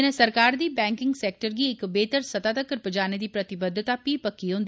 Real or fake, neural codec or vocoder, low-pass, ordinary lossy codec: real; none; none; none